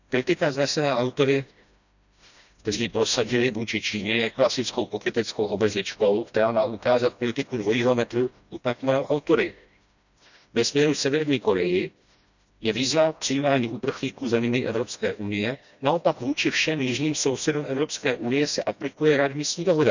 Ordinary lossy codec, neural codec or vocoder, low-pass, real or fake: none; codec, 16 kHz, 1 kbps, FreqCodec, smaller model; 7.2 kHz; fake